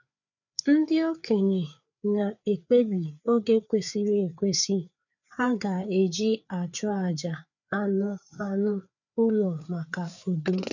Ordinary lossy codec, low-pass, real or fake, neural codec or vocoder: none; 7.2 kHz; fake; codec, 16 kHz, 4 kbps, FreqCodec, larger model